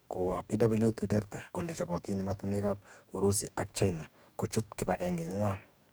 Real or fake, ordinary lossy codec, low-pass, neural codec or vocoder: fake; none; none; codec, 44.1 kHz, 2.6 kbps, DAC